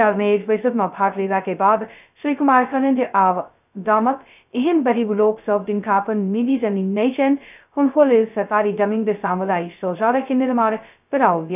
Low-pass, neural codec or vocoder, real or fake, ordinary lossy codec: 3.6 kHz; codec, 16 kHz, 0.2 kbps, FocalCodec; fake; none